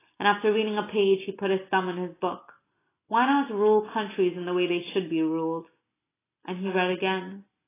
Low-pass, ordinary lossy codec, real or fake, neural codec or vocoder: 3.6 kHz; AAC, 16 kbps; real; none